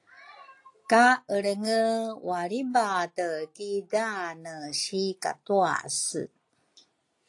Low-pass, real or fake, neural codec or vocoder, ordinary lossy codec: 10.8 kHz; real; none; AAC, 48 kbps